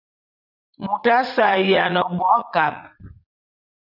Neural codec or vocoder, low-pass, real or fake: vocoder, 44.1 kHz, 80 mel bands, Vocos; 5.4 kHz; fake